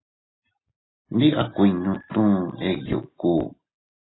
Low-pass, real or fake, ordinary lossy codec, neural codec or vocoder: 7.2 kHz; real; AAC, 16 kbps; none